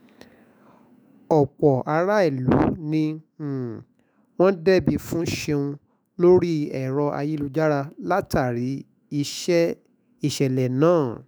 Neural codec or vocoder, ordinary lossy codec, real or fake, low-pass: autoencoder, 48 kHz, 128 numbers a frame, DAC-VAE, trained on Japanese speech; none; fake; none